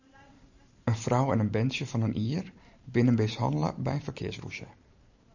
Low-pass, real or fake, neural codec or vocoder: 7.2 kHz; fake; vocoder, 44.1 kHz, 128 mel bands every 512 samples, BigVGAN v2